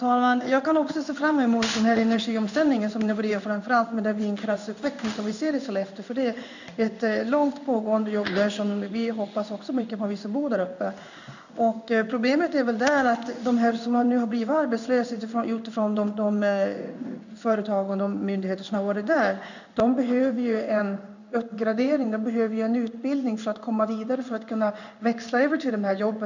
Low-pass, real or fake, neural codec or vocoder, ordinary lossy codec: 7.2 kHz; fake; codec, 16 kHz in and 24 kHz out, 1 kbps, XY-Tokenizer; none